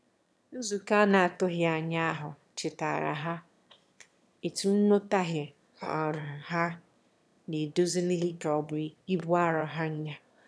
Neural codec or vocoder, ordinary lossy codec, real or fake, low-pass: autoencoder, 22.05 kHz, a latent of 192 numbers a frame, VITS, trained on one speaker; none; fake; none